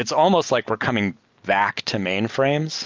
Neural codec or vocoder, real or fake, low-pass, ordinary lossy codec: none; real; 7.2 kHz; Opus, 32 kbps